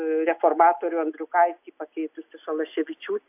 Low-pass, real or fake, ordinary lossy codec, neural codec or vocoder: 3.6 kHz; real; AAC, 32 kbps; none